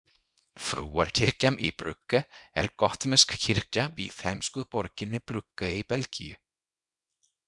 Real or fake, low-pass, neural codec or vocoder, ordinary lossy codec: fake; 10.8 kHz; codec, 24 kHz, 0.9 kbps, WavTokenizer, small release; MP3, 96 kbps